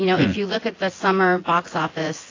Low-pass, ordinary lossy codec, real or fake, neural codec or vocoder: 7.2 kHz; AAC, 32 kbps; fake; vocoder, 24 kHz, 100 mel bands, Vocos